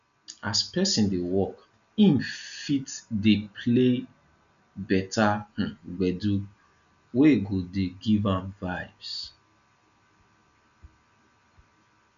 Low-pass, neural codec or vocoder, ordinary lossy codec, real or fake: 7.2 kHz; none; none; real